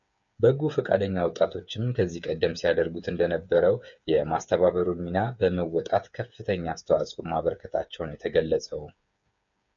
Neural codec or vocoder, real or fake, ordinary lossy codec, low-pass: codec, 16 kHz, 8 kbps, FreqCodec, smaller model; fake; MP3, 96 kbps; 7.2 kHz